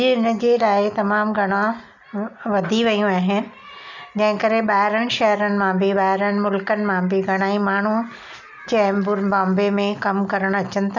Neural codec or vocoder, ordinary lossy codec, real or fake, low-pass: none; none; real; 7.2 kHz